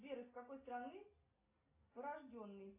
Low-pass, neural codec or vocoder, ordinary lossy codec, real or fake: 3.6 kHz; none; AAC, 24 kbps; real